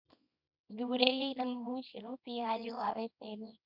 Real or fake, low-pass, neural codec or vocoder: fake; 5.4 kHz; codec, 24 kHz, 0.9 kbps, WavTokenizer, small release